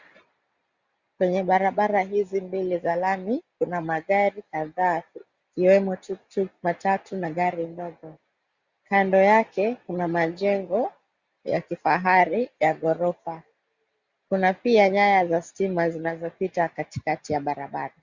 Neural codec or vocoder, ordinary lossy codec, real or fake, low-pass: none; Opus, 64 kbps; real; 7.2 kHz